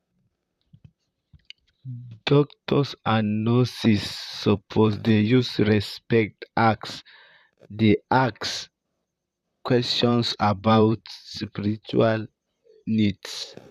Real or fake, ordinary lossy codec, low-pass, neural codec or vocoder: fake; none; 14.4 kHz; vocoder, 44.1 kHz, 128 mel bands, Pupu-Vocoder